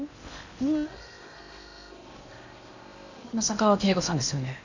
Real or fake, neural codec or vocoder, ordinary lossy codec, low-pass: fake; codec, 16 kHz in and 24 kHz out, 0.8 kbps, FocalCodec, streaming, 65536 codes; none; 7.2 kHz